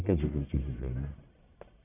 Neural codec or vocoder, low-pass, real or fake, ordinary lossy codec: codec, 44.1 kHz, 1.7 kbps, Pupu-Codec; 3.6 kHz; fake; none